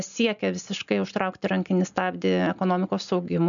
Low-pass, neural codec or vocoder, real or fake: 7.2 kHz; none; real